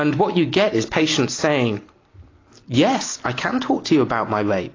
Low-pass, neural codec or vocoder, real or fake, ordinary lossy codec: 7.2 kHz; none; real; AAC, 32 kbps